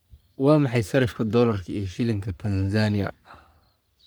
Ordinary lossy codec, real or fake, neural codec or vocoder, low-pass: none; fake; codec, 44.1 kHz, 3.4 kbps, Pupu-Codec; none